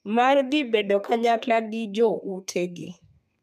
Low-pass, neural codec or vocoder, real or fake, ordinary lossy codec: 14.4 kHz; codec, 32 kHz, 1.9 kbps, SNAC; fake; none